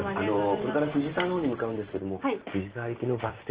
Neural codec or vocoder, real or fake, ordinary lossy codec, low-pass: none; real; Opus, 16 kbps; 3.6 kHz